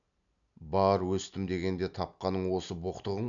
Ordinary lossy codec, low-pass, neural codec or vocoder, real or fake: none; 7.2 kHz; none; real